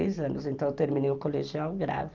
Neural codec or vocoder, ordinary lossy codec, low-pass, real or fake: none; Opus, 24 kbps; 7.2 kHz; real